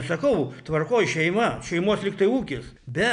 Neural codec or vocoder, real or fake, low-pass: none; real; 9.9 kHz